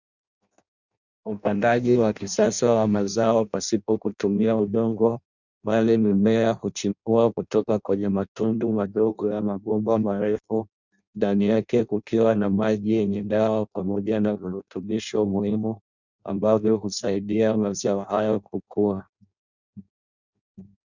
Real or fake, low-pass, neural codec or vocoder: fake; 7.2 kHz; codec, 16 kHz in and 24 kHz out, 0.6 kbps, FireRedTTS-2 codec